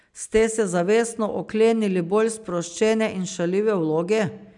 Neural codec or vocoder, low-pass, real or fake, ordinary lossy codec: none; 10.8 kHz; real; none